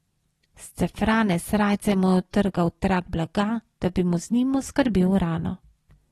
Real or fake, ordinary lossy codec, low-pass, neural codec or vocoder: real; AAC, 32 kbps; 14.4 kHz; none